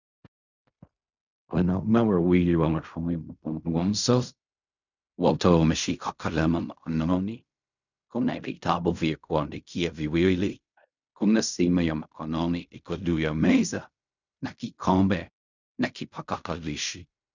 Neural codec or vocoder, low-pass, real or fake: codec, 16 kHz in and 24 kHz out, 0.4 kbps, LongCat-Audio-Codec, fine tuned four codebook decoder; 7.2 kHz; fake